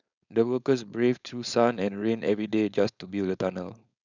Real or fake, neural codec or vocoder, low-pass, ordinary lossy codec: fake; codec, 16 kHz, 4.8 kbps, FACodec; 7.2 kHz; none